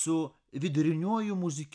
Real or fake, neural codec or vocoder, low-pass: real; none; 9.9 kHz